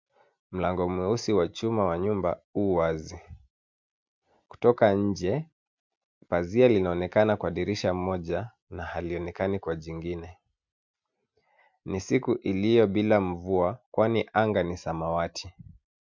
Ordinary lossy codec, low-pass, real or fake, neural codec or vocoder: MP3, 64 kbps; 7.2 kHz; real; none